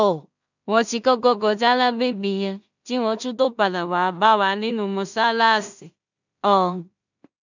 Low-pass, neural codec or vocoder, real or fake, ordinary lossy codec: 7.2 kHz; codec, 16 kHz in and 24 kHz out, 0.4 kbps, LongCat-Audio-Codec, two codebook decoder; fake; none